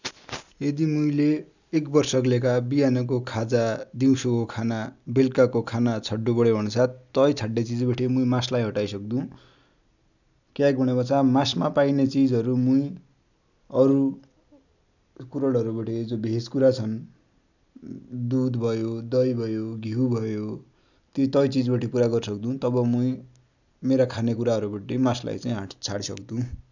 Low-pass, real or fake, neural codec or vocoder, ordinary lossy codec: 7.2 kHz; real; none; none